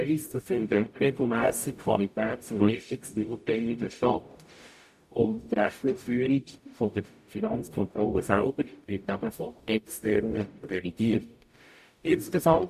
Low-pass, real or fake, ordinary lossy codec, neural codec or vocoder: 14.4 kHz; fake; none; codec, 44.1 kHz, 0.9 kbps, DAC